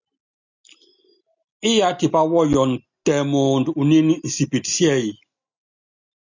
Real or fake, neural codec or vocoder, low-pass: real; none; 7.2 kHz